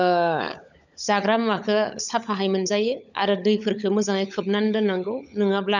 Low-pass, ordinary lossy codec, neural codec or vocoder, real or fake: 7.2 kHz; none; codec, 16 kHz, 16 kbps, FunCodec, trained on LibriTTS, 50 frames a second; fake